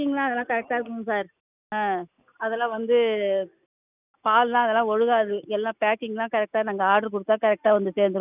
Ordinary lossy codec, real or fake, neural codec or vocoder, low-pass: none; real; none; 3.6 kHz